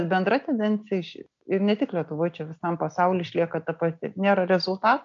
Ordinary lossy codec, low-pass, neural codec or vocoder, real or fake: MP3, 96 kbps; 7.2 kHz; none; real